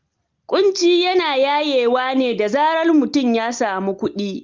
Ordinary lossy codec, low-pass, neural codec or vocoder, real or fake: Opus, 32 kbps; 7.2 kHz; none; real